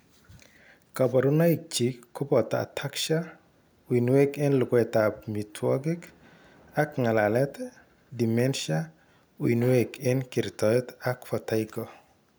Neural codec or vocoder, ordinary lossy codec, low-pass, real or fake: none; none; none; real